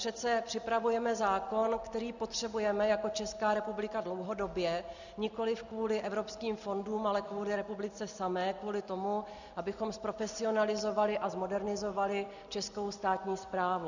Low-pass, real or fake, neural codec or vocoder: 7.2 kHz; real; none